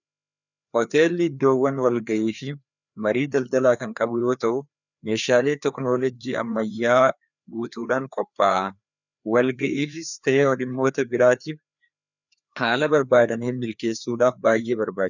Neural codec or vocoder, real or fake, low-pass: codec, 16 kHz, 2 kbps, FreqCodec, larger model; fake; 7.2 kHz